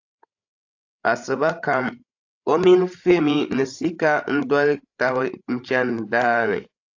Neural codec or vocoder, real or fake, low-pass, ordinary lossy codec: codec, 16 kHz, 16 kbps, FreqCodec, larger model; fake; 7.2 kHz; AAC, 48 kbps